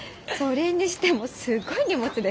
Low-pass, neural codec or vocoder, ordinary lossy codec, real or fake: none; none; none; real